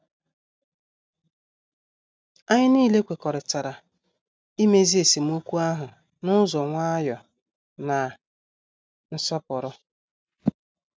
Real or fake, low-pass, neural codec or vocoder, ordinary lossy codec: real; none; none; none